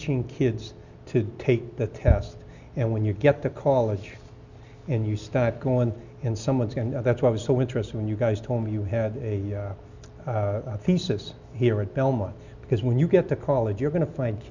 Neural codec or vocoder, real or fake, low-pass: none; real; 7.2 kHz